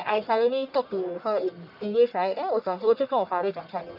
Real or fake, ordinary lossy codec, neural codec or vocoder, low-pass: fake; none; codec, 44.1 kHz, 1.7 kbps, Pupu-Codec; 5.4 kHz